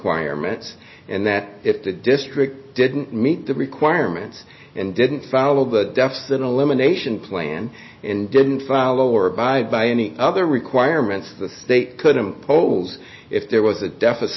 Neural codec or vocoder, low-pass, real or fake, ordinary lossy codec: none; 7.2 kHz; real; MP3, 24 kbps